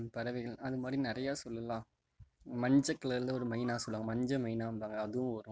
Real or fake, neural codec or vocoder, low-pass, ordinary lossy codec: real; none; none; none